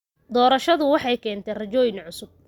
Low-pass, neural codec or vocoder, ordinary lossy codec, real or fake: 19.8 kHz; none; Opus, 64 kbps; real